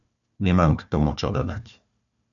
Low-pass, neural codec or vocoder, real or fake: 7.2 kHz; codec, 16 kHz, 1 kbps, FunCodec, trained on Chinese and English, 50 frames a second; fake